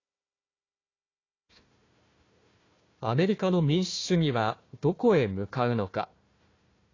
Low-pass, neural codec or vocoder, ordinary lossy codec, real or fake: 7.2 kHz; codec, 16 kHz, 1 kbps, FunCodec, trained on Chinese and English, 50 frames a second; AAC, 32 kbps; fake